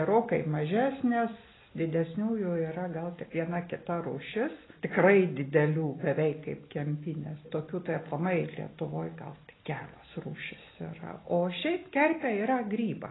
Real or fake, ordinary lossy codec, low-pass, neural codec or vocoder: real; AAC, 16 kbps; 7.2 kHz; none